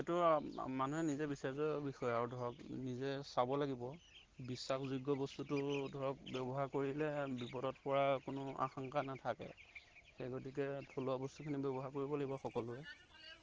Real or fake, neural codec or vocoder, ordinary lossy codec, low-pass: real; none; Opus, 16 kbps; 7.2 kHz